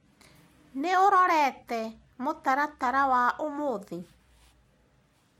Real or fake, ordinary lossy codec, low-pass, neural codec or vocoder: real; MP3, 64 kbps; 19.8 kHz; none